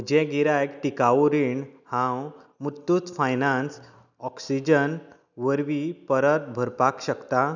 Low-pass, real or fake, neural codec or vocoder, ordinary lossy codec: 7.2 kHz; real; none; none